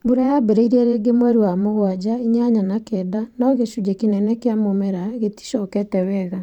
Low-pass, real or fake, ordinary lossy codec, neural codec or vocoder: 19.8 kHz; fake; none; vocoder, 44.1 kHz, 128 mel bands every 512 samples, BigVGAN v2